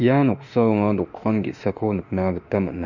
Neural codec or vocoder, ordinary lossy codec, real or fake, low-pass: autoencoder, 48 kHz, 32 numbers a frame, DAC-VAE, trained on Japanese speech; none; fake; 7.2 kHz